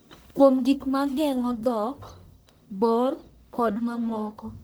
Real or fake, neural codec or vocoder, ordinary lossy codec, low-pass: fake; codec, 44.1 kHz, 1.7 kbps, Pupu-Codec; none; none